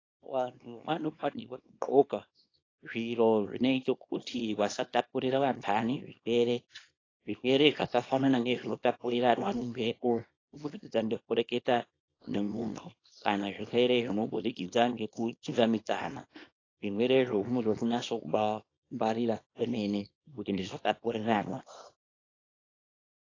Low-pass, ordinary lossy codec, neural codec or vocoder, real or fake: 7.2 kHz; AAC, 32 kbps; codec, 24 kHz, 0.9 kbps, WavTokenizer, small release; fake